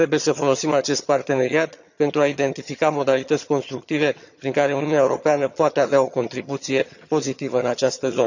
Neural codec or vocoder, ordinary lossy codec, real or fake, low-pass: vocoder, 22.05 kHz, 80 mel bands, HiFi-GAN; none; fake; 7.2 kHz